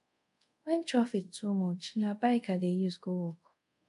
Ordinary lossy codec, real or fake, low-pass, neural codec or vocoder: AAC, 64 kbps; fake; 10.8 kHz; codec, 24 kHz, 0.5 kbps, DualCodec